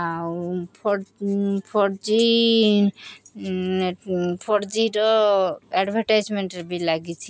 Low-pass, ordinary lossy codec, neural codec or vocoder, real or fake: none; none; none; real